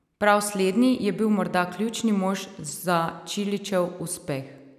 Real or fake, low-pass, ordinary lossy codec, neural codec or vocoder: real; 14.4 kHz; none; none